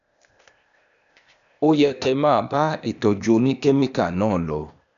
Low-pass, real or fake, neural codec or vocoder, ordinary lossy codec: 7.2 kHz; fake; codec, 16 kHz, 0.8 kbps, ZipCodec; none